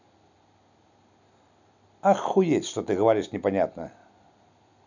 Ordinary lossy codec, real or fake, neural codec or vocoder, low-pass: none; real; none; 7.2 kHz